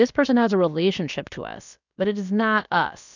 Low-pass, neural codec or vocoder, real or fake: 7.2 kHz; codec, 16 kHz, about 1 kbps, DyCAST, with the encoder's durations; fake